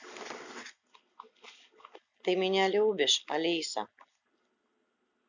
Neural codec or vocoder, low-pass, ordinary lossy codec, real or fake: none; 7.2 kHz; none; real